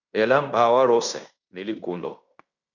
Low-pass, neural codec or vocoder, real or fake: 7.2 kHz; codec, 16 kHz in and 24 kHz out, 0.9 kbps, LongCat-Audio-Codec, fine tuned four codebook decoder; fake